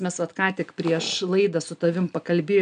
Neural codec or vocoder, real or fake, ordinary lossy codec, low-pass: none; real; MP3, 96 kbps; 9.9 kHz